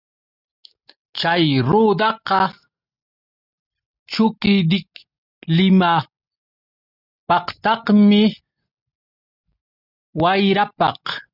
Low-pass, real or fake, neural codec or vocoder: 5.4 kHz; real; none